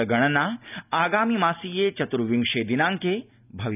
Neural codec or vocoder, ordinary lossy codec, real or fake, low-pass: none; none; real; 3.6 kHz